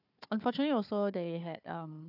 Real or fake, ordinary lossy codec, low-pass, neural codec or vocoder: fake; none; 5.4 kHz; codec, 16 kHz, 4 kbps, FunCodec, trained on Chinese and English, 50 frames a second